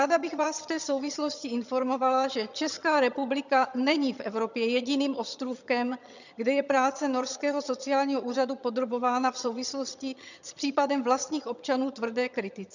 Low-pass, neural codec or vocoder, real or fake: 7.2 kHz; vocoder, 22.05 kHz, 80 mel bands, HiFi-GAN; fake